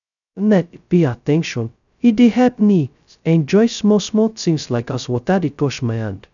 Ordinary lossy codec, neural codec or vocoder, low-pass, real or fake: none; codec, 16 kHz, 0.2 kbps, FocalCodec; 7.2 kHz; fake